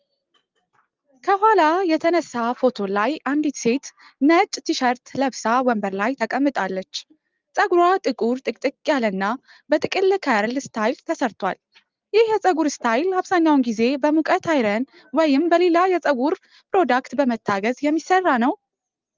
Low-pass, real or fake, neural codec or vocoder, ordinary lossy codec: 7.2 kHz; real; none; Opus, 32 kbps